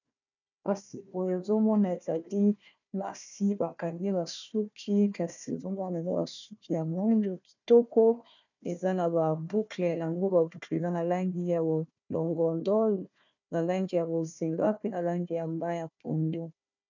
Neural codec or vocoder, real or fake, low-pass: codec, 16 kHz, 1 kbps, FunCodec, trained on Chinese and English, 50 frames a second; fake; 7.2 kHz